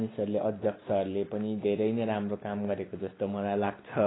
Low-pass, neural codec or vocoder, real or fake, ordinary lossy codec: 7.2 kHz; none; real; AAC, 16 kbps